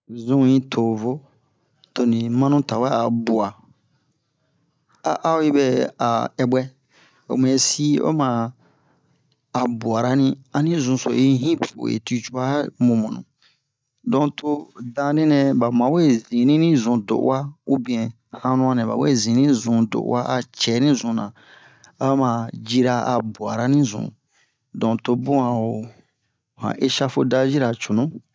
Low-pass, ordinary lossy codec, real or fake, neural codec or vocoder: none; none; real; none